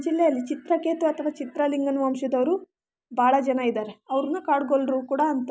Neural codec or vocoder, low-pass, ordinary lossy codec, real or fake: none; none; none; real